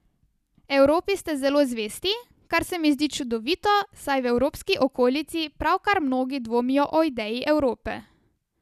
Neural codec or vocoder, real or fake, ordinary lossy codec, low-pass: none; real; none; 14.4 kHz